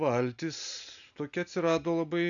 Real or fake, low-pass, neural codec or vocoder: real; 7.2 kHz; none